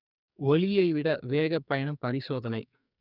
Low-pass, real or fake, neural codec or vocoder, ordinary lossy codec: 5.4 kHz; fake; codec, 44.1 kHz, 2.6 kbps, SNAC; none